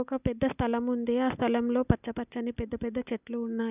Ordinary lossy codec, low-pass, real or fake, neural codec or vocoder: none; 3.6 kHz; real; none